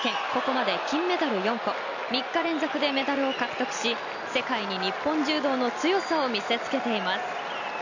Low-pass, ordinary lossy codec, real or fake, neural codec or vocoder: 7.2 kHz; none; real; none